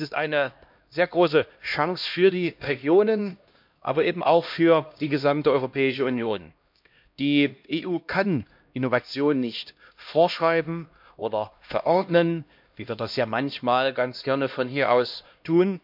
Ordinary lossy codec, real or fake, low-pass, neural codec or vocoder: MP3, 48 kbps; fake; 5.4 kHz; codec, 16 kHz, 1 kbps, X-Codec, HuBERT features, trained on LibriSpeech